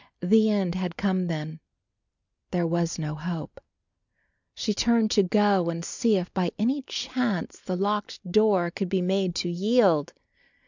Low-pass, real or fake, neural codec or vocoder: 7.2 kHz; real; none